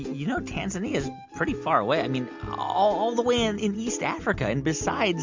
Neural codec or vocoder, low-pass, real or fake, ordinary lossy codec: none; 7.2 kHz; real; MP3, 64 kbps